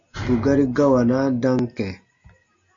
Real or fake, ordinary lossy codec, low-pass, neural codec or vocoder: real; AAC, 64 kbps; 7.2 kHz; none